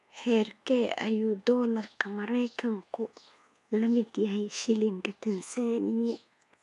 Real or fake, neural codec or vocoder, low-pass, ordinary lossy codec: fake; codec, 24 kHz, 1.2 kbps, DualCodec; 10.8 kHz; none